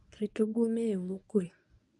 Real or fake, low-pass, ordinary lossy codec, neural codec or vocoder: fake; none; none; codec, 24 kHz, 0.9 kbps, WavTokenizer, medium speech release version 1